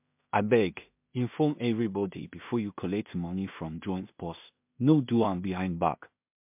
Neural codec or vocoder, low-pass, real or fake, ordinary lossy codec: codec, 16 kHz in and 24 kHz out, 0.4 kbps, LongCat-Audio-Codec, two codebook decoder; 3.6 kHz; fake; MP3, 32 kbps